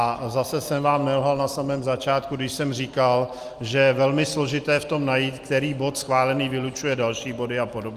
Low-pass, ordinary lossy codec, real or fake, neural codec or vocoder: 14.4 kHz; Opus, 32 kbps; real; none